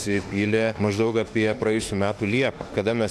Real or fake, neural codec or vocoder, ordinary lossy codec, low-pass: fake; autoencoder, 48 kHz, 32 numbers a frame, DAC-VAE, trained on Japanese speech; AAC, 64 kbps; 14.4 kHz